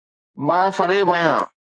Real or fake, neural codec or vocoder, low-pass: fake; codec, 44.1 kHz, 2.6 kbps, SNAC; 9.9 kHz